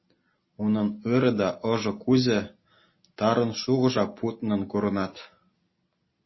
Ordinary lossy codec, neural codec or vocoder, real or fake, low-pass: MP3, 24 kbps; none; real; 7.2 kHz